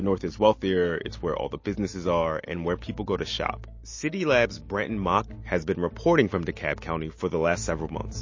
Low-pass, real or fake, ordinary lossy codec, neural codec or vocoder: 7.2 kHz; real; MP3, 32 kbps; none